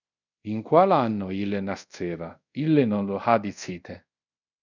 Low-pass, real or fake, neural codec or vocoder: 7.2 kHz; fake; codec, 24 kHz, 0.5 kbps, DualCodec